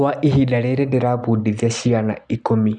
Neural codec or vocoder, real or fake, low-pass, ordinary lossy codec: none; real; 10.8 kHz; none